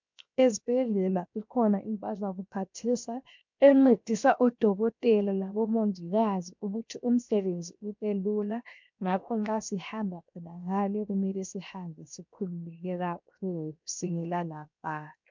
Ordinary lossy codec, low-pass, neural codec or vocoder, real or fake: MP3, 64 kbps; 7.2 kHz; codec, 16 kHz, 0.7 kbps, FocalCodec; fake